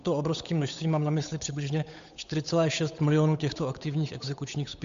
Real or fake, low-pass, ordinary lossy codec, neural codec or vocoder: fake; 7.2 kHz; MP3, 64 kbps; codec, 16 kHz, 8 kbps, FunCodec, trained on Chinese and English, 25 frames a second